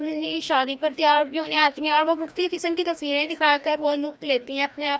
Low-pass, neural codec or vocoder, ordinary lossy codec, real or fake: none; codec, 16 kHz, 1 kbps, FreqCodec, larger model; none; fake